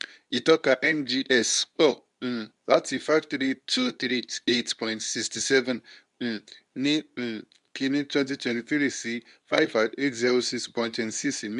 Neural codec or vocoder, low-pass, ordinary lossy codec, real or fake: codec, 24 kHz, 0.9 kbps, WavTokenizer, medium speech release version 2; 10.8 kHz; none; fake